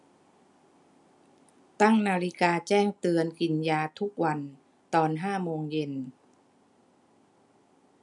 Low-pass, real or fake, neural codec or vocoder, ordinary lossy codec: 10.8 kHz; real; none; none